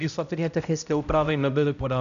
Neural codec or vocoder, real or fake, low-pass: codec, 16 kHz, 0.5 kbps, X-Codec, HuBERT features, trained on balanced general audio; fake; 7.2 kHz